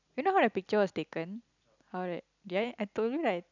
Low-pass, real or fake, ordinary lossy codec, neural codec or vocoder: 7.2 kHz; real; none; none